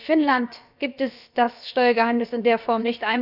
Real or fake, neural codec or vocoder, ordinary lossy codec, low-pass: fake; codec, 16 kHz, about 1 kbps, DyCAST, with the encoder's durations; none; 5.4 kHz